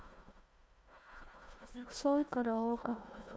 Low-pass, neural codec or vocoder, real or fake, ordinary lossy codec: none; codec, 16 kHz, 1 kbps, FunCodec, trained on Chinese and English, 50 frames a second; fake; none